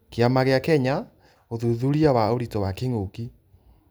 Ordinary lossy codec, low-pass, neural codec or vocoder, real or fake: none; none; none; real